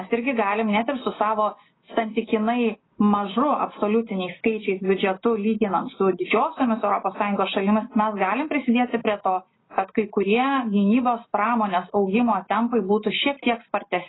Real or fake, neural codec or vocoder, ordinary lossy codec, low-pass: real; none; AAC, 16 kbps; 7.2 kHz